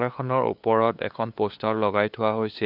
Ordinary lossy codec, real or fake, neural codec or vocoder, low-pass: AAC, 48 kbps; fake; codec, 16 kHz, 2 kbps, FunCodec, trained on LibriTTS, 25 frames a second; 5.4 kHz